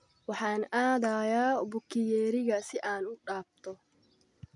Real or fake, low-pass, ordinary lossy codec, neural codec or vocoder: real; 10.8 kHz; none; none